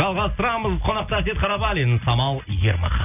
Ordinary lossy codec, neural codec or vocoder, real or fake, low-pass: none; none; real; 3.6 kHz